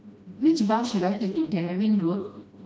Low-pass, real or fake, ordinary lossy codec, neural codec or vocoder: none; fake; none; codec, 16 kHz, 1 kbps, FreqCodec, smaller model